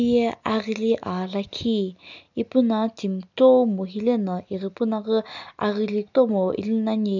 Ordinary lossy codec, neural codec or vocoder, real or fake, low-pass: none; none; real; 7.2 kHz